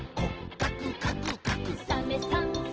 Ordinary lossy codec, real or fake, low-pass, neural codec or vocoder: Opus, 16 kbps; real; 7.2 kHz; none